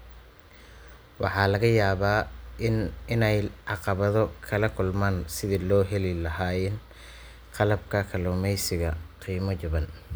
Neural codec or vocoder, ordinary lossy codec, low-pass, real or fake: none; none; none; real